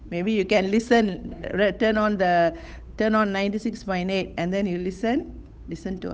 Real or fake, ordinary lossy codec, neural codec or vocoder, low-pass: fake; none; codec, 16 kHz, 8 kbps, FunCodec, trained on Chinese and English, 25 frames a second; none